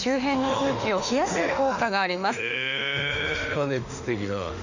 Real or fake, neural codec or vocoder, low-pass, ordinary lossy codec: fake; autoencoder, 48 kHz, 32 numbers a frame, DAC-VAE, trained on Japanese speech; 7.2 kHz; none